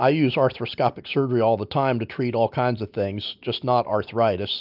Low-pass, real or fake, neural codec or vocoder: 5.4 kHz; real; none